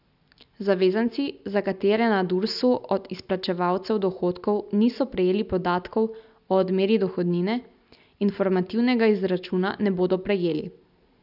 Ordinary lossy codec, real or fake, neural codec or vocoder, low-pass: none; real; none; 5.4 kHz